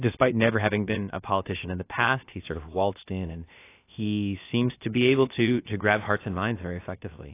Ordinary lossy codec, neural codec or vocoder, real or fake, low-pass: AAC, 24 kbps; codec, 16 kHz, about 1 kbps, DyCAST, with the encoder's durations; fake; 3.6 kHz